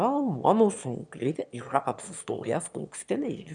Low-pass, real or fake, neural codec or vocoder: 9.9 kHz; fake; autoencoder, 22.05 kHz, a latent of 192 numbers a frame, VITS, trained on one speaker